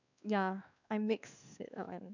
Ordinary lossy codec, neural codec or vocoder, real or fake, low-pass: none; codec, 16 kHz, 2 kbps, X-Codec, WavLM features, trained on Multilingual LibriSpeech; fake; 7.2 kHz